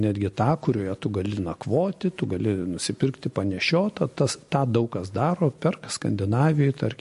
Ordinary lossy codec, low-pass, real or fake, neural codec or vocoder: MP3, 48 kbps; 14.4 kHz; real; none